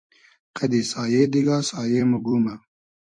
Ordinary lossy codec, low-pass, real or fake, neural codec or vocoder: MP3, 48 kbps; 9.9 kHz; fake; vocoder, 44.1 kHz, 128 mel bands every 256 samples, BigVGAN v2